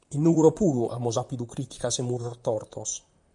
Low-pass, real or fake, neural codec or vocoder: 10.8 kHz; fake; vocoder, 44.1 kHz, 128 mel bands, Pupu-Vocoder